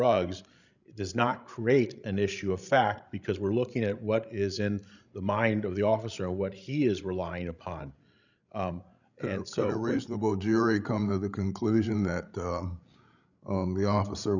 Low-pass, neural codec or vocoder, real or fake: 7.2 kHz; codec, 16 kHz, 16 kbps, FreqCodec, larger model; fake